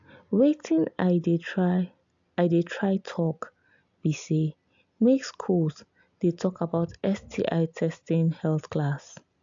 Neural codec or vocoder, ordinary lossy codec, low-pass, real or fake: none; AAC, 64 kbps; 7.2 kHz; real